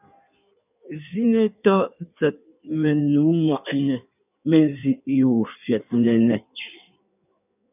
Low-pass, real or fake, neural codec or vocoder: 3.6 kHz; fake; codec, 16 kHz in and 24 kHz out, 1.1 kbps, FireRedTTS-2 codec